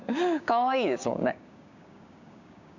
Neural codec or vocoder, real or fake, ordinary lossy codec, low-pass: codec, 16 kHz, 6 kbps, DAC; fake; none; 7.2 kHz